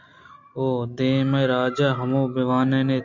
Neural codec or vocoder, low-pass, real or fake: none; 7.2 kHz; real